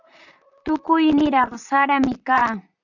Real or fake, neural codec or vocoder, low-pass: fake; vocoder, 44.1 kHz, 128 mel bands, Pupu-Vocoder; 7.2 kHz